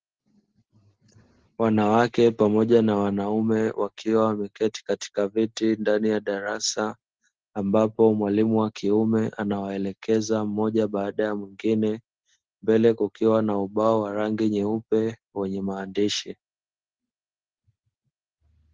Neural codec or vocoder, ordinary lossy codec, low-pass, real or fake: none; Opus, 16 kbps; 7.2 kHz; real